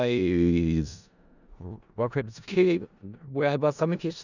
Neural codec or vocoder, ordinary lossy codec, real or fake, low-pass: codec, 16 kHz in and 24 kHz out, 0.4 kbps, LongCat-Audio-Codec, four codebook decoder; none; fake; 7.2 kHz